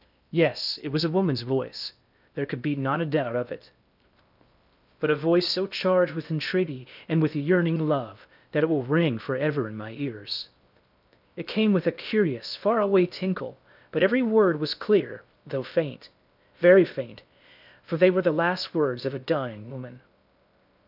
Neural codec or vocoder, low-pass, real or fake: codec, 16 kHz in and 24 kHz out, 0.6 kbps, FocalCodec, streaming, 2048 codes; 5.4 kHz; fake